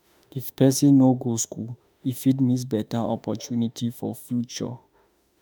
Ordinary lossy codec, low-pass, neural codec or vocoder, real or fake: none; none; autoencoder, 48 kHz, 32 numbers a frame, DAC-VAE, trained on Japanese speech; fake